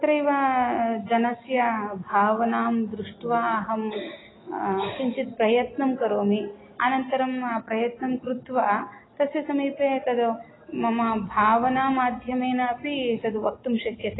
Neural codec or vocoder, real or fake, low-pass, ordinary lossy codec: none; real; 7.2 kHz; AAC, 16 kbps